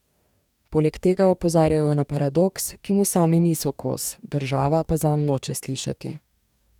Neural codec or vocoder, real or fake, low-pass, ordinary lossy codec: codec, 44.1 kHz, 2.6 kbps, DAC; fake; 19.8 kHz; none